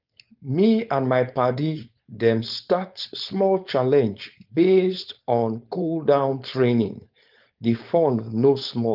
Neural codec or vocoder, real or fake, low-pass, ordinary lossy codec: codec, 16 kHz, 4.8 kbps, FACodec; fake; 5.4 kHz; Opus, 24 kbps